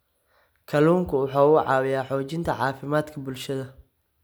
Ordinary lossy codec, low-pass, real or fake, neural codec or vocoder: none; none; real; none